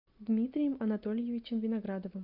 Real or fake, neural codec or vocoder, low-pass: real; none; 5.4 kHz